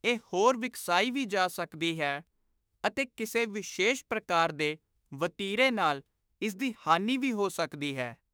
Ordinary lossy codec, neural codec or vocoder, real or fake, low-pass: none; autoencoder, 48 kHz, 32 numbers a frame, DAC-VAE, trained on Japanese speech; fake; none